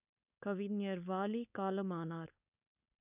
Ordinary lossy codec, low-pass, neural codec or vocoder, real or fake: none; 3.6 kHz; codec, 16 kHz, 4.8 kbps, FACodec; fake